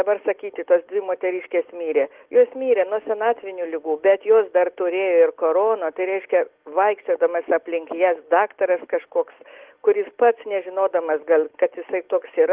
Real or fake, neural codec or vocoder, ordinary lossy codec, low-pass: real; none; Opus, 16 kbps; 3.6 kHz